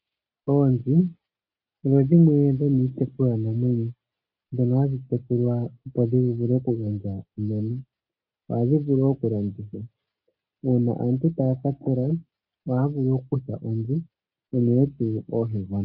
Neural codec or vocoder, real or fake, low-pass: none; real; 5.4 kHz